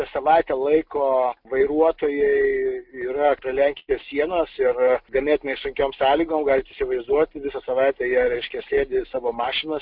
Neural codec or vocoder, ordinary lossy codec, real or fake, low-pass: none; MP3, 48 kbps; real; 5.4 kHz